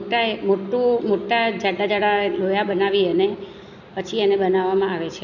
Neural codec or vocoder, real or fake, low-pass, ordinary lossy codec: none; real; 7.2 kHz; none